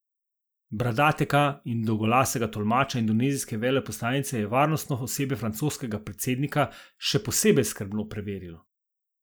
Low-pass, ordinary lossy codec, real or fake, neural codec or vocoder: none; none; real; none